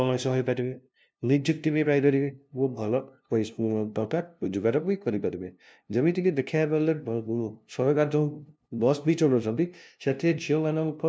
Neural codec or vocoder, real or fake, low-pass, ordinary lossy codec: codec, 16 kHz, 0.5 kbps, FunCodec, trained on LibriTTS, 25 frames a second; fake; none; none